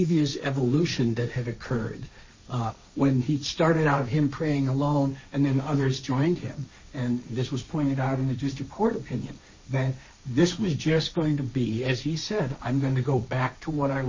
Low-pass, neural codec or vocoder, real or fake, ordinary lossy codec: 7.2 kHz; codec, 16 kHz, 1.1 kbps, Voila-Tokenizer; fake; MP3, 32 kbps